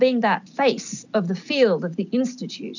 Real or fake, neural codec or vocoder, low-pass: real; none; 7.2 kHz